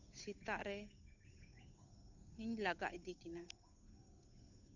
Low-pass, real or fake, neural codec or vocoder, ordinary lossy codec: 7.2 kHz; fake; codec, 16 kHz, 8 kbps, FunCodec, trained on Chinese and English, 25 frames a second; none